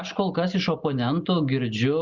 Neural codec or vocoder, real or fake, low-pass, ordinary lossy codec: none; real; 7.2 kHz; Opus, 64 kbps